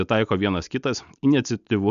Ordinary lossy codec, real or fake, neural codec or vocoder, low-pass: MP3, 96 kbps; real; none; 7.2 kHz